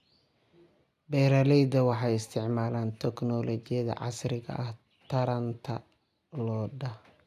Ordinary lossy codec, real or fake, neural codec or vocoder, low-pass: none; real; none; 14.4 kHz